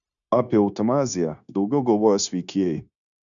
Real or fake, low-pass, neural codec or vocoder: fake; 7.2 kHz; codec, 16 kHz, 0.9 kbps, LongCat-Audio-Codec